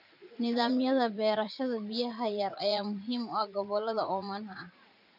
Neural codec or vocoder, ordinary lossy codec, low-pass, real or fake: vocoder, 44.1 kHz, 80 mel bands, Vocos; none; 5.4 kHz; fake